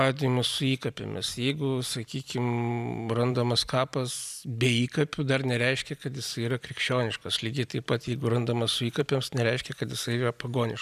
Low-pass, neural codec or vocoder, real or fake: 14.4 kHz; vocoder, 44.1 kHz, 128 mel bands every 256 samples, BigVGAN v2; fake